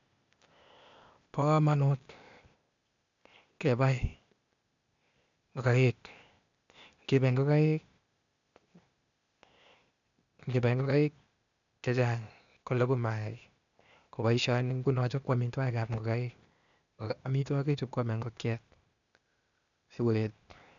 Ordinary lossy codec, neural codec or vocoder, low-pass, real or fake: none; codec, 16 kHz, 0.8 kbps, ZipCodec; 7.2 kHz; fake